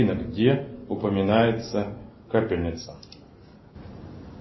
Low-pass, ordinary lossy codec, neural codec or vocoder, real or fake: 7.2 kHz; MP3, 24 kbps; none; real